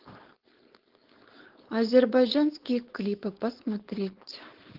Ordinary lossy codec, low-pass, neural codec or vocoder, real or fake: Opus, 16 kbps; 5.4 kHz; codec, 16 kHz, 4.8 kbps, FACodec; fake